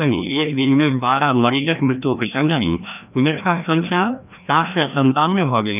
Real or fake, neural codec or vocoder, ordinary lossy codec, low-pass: fake; codec, 16 kHz, 1 kbps, FreqCodec, larger model; none; 3.6 kHz